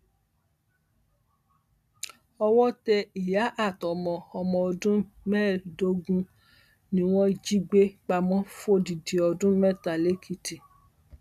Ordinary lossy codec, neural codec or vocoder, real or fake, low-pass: none; none; real; 14.4 kHz